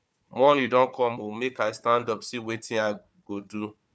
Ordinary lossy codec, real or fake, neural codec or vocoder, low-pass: none; fake; codec, 16 kHz, 4 kbps, FunCodec, trained on Chinese and English, 50 frames a second; none